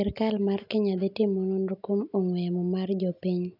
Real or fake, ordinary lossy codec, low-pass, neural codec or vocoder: real; none; 5.4 kHz; none